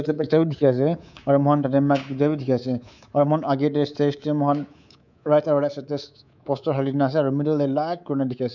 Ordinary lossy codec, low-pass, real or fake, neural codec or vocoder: none; 7.2 kHz; fake; codec, 24 kHz, 3.1 kbps, DualCodec